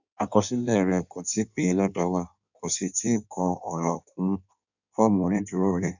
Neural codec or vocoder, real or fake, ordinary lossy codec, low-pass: codec, 16 kHz in and 24 kHz out, 1.1 kbps, FireRedTTS-2 codec; fake; none; 7.2 kHz